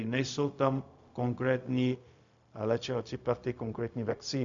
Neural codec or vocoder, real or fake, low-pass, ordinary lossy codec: codec, 16 kHz, 0.4 kbps, LongCat-Audio-Codec; fake; 7.2 kHz; MP3, 64 kbps